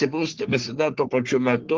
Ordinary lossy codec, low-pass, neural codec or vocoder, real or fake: Opus, 16 kbps; 7.2 kHz; codec, 44.1 kHz, 3.4 kbps, Pupu-Codec; fake